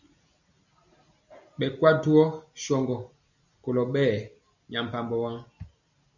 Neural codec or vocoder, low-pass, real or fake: none; 7.2 kHz; real